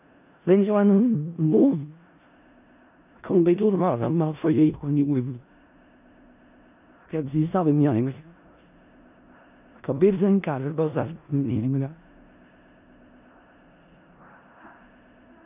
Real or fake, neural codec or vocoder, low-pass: fake; codec, 16 kHz in and 24 kHz out, 0.4 kbps, LongCat-Audio-Codec, four codebook decoder; 3.6 kHz